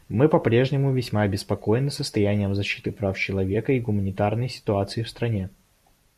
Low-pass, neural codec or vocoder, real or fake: 14.4 kHz; vocoder, 44.1 kHz, 128 mel bands every 256 samples, BigVGAN v2; fake